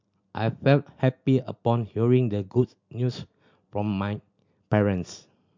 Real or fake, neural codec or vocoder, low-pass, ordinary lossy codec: real; none; 7.2 kHz; MP3, 64 kbps